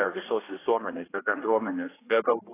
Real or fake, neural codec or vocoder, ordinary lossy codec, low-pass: fake; codec, 16 kHz, 1 kbps, X-Codec, HuBERT features, trained on general audio; AAC, 16 kbps; 3.6 kHz